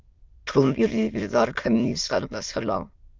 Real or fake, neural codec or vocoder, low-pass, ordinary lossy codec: fake; autoencoder, 22.05 kHz, a latent of 192 numbers a frame, VITS, trained on many speakers; 7.2 kHz; Opus, 24 kbps